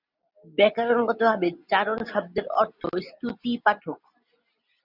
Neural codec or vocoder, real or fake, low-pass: none; real; 5.4 kHz